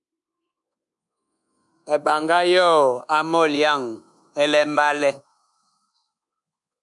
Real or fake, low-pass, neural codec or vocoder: fake; 10.8 kHz; codec, 24 kHz, 1.2 kbps, DualCodec